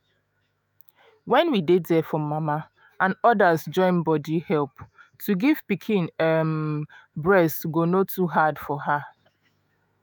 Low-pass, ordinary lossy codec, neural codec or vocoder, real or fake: none; none; autoencoder, 48 kHz, 128 numbers a frame, DAC-VAE, trained on Japanese speech; fake